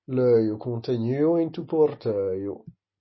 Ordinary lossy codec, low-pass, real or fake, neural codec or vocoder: MP3, 24 kbps; 7.2 kHz; real; none